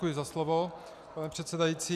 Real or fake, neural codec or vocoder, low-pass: real; none; 14.4 kHz